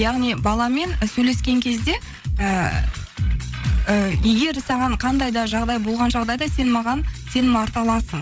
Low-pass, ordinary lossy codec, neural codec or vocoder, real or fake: none; none; codec, 16 kHz, 8 kbps, FreqCodec, larger model; fake